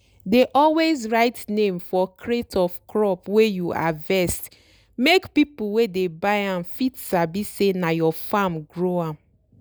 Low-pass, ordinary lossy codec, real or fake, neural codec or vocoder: none; none; real; none